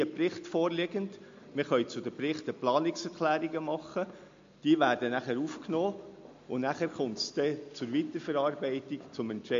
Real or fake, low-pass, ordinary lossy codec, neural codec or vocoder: real; 7.2 kHz; MP3, 48 kbps; none